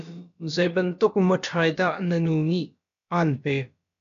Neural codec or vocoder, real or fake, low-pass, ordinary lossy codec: codec, 16 kHz, about 1 kbps, DyCAST, with the encoder's durations; fake; 7.2 kHz; MP3, 96 kbps